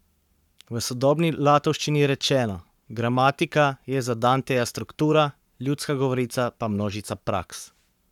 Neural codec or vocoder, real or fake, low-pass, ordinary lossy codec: codec, 44.1 kHz, 7.8 kbps, Pupu-Codec; fake; 19.8 kHz; none